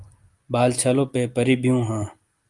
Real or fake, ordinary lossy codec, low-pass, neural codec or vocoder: real; Opus, 32 kbps; 10.8 kHz; none